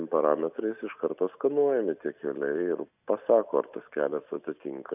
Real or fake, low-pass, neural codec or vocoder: real; 3.6 kHz; none